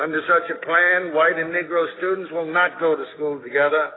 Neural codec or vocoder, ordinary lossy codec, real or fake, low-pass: codec, 16 kHz, 6 kbps, DAC; AAC, 16 kbps; fake; 7.2 kHz